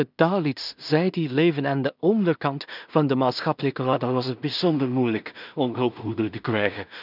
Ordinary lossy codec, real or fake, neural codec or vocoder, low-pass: none; fake; codec, 16 kHz in and 24 kHz out, 0.4 kbps, LongCat-Audio-Codec, two codebook decoder; 5.4 kHz